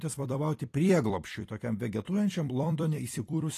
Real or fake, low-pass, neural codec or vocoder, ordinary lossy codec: fake; 14.4 kHz; vocoder, 44.1 kHz, 128 mel bands every 256 samples, BigVGAN v2; AAC, 48 kbps